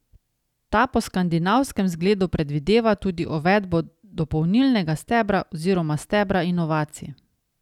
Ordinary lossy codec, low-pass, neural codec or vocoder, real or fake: none; 19.8 kHz; none; real